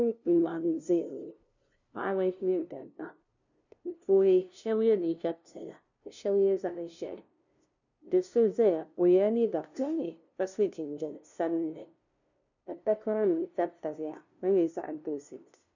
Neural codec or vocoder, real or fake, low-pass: codec, 16 kHz, 0.5 kbps, FunCodec, trained on LibriTTS, 25 frames a second; fake; 7.2 kHz